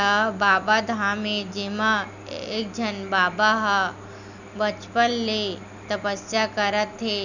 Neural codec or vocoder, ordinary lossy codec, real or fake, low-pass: none; none; real; 7.2 kHz